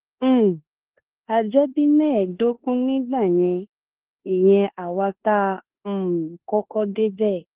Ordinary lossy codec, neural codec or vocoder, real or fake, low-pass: Opus, 16 kbps; codec, 16 kHz in and 24 kHz out, 0.9 kbps, LongCat-Audio-Codec, four codebook decoder; fake; 3.6 kHz